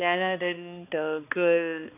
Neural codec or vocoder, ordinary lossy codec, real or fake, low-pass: codec, 16 kHz, 2 kbps, X-Codec, HuBERT features, trained on balanced general audio; none; fake; 3.6 kHz